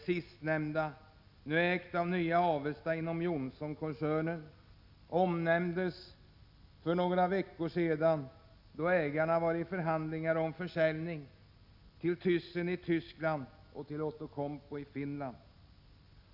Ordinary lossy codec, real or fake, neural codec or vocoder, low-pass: none; real; none; 5.4 kHz